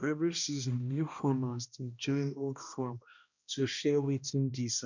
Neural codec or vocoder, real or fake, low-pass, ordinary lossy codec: codec, 16 kHz, 1 kbps, X-Codec, HuBERT features, trained on balanced general audio; fake; 7.2 kHz; none